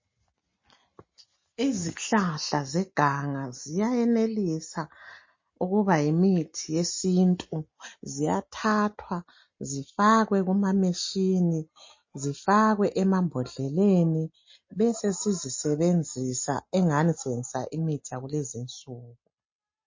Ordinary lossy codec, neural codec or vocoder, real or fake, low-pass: MP3, 32 kbps; none; real; 7.2 kHz